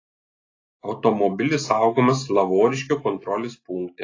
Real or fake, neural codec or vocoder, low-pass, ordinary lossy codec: real; none; 7.2 kHz; AAC, 32 kbps